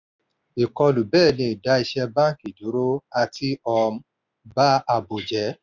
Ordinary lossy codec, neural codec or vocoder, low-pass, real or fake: none; none; 7.2 kHz; real